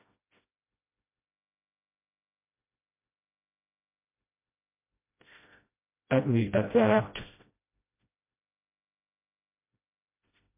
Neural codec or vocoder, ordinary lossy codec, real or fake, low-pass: codec, 16 kHz, 0.5 kbps, FreqCodec, smaller model; MP3, 24 kbps; fake; 3.6 kHz